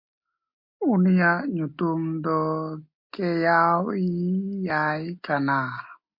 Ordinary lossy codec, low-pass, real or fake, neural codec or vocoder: MP3, 32 kbps; 5.4 kHz; real; none